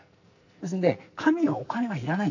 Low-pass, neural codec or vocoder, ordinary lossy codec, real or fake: 7.2 kHz; codec, 44.1 kHz, 2.6 kbps, SNAC; AAC, 48 kbps; fake